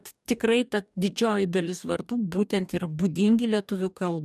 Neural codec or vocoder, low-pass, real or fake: codec, 44.1 kHz, 2.6 kbps, DAC; 14.4 kHz; fake